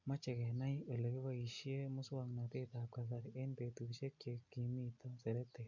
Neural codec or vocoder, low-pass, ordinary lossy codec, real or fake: none; 7.2 kHz; MP3, 64 kbps; real